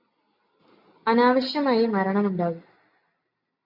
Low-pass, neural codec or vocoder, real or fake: 5.4 kHz; none; real